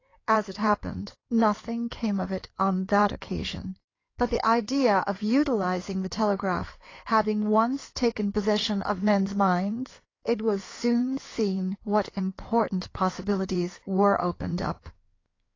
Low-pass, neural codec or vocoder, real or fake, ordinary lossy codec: 7.2 kHz; codec, 16 kHz in and 24 kHz out, 2.2 kbps, FireRedTTS-2 codec; fake; AAC, 32 kbps